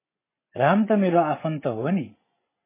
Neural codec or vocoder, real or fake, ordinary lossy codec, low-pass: vocoder, 24 kHz, 100 mel bands, Vocos; fake; MP3, 16 kbps; 3.6 kHz